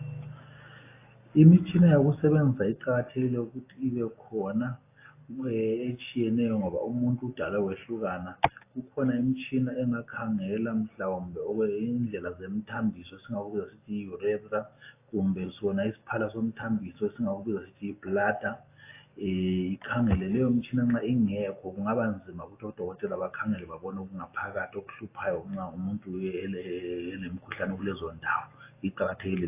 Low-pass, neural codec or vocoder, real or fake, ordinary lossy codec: 3.6 kHz; none; real; AAC, 24 kbps